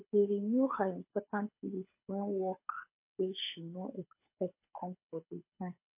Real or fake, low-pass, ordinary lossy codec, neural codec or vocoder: fake; 3.6 kHz; MP3, 24 kbps; codec, 24 kHz, 6 kbps, HILCodec